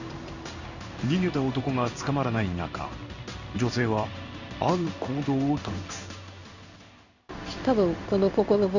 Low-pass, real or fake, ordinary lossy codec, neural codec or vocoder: 7.2 kHz; fake; none; codec, 16 kHz in and 24 kHz out, 1 kbps, XY-Tokenizer